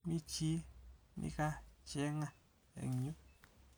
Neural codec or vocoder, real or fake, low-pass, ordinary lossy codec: none; real; none; none